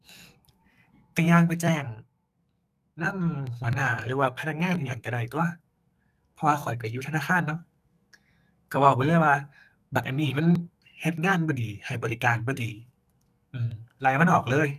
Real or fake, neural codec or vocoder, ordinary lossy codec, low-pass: fake; codec, 44.1 kHz, 2.6 kbps, SNAC; none; 14.4 kHz